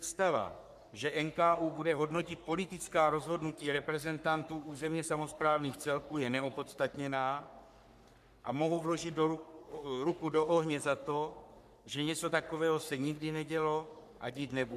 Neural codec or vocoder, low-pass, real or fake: codec, 44.1 kHz, 3.4 kbps, Pupu-Codec; 14.4 kHz; fake